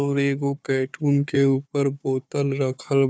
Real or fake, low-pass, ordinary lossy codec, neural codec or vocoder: fake; none; none; codec, 16 kHz, 4 kbps, FunCodec, trained on Chinese and English, 50 frames a second